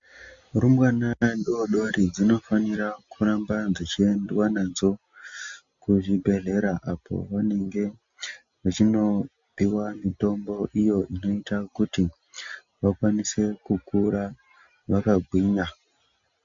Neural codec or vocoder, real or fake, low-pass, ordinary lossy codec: none; real; 7.2 kHz; MP3, 48 kbps